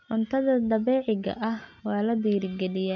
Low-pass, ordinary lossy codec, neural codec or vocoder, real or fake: 7.2 kHz; none; none; real